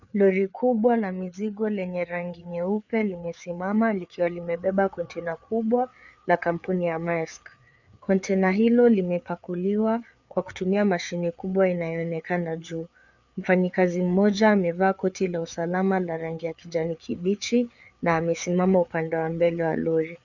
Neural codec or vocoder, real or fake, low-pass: codec, 16 kHz, 4 kbps, FreqCodec, larger model; fake; 7.2 kHz